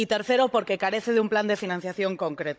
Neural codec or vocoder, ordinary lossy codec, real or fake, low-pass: codec, 16 kHz, 16 kbps, FunCodec, trained on Chinese and English, 50 frames a second; none; fake; none